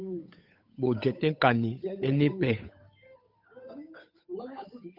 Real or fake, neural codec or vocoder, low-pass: fake; codec, 16 kHz, 8 kbps, FunCodec, trained on Chinese and English, 25 frames a second; 5.4 kHz